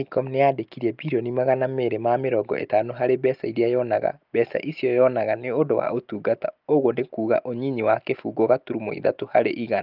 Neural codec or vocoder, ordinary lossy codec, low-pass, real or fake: none; Opus, 24 kbps; 5.4 kHz; real